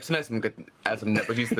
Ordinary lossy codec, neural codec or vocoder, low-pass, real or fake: Opus, 32 kbps; codec, 44.1 kHz, 7.8 kbps, Pupu-Codec; 14.4 kHz; fake